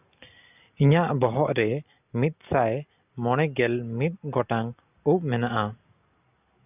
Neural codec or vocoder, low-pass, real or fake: none; 3.6 kHz; real